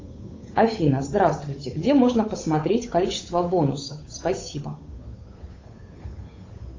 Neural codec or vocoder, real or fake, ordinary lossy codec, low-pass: codec, 16 kHz, 8 kbps, FunCodec, trained on Chinese and English, 25 frames a second; fake; AAC, 32 kbps; 7.2 kHz